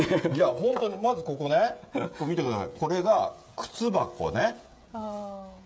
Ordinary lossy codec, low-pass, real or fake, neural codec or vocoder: none; none; fake; codec, 16 kHz, 16 kbps, FreqCodec, smaller model